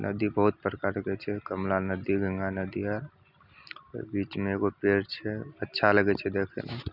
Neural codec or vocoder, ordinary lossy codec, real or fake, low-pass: none; none; real; 5.4 kHz